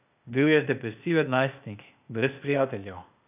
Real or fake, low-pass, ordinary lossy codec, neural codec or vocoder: fake; 3.6 kHz; none; codec, 16 kHz, 0.8 kbps, ZipCodec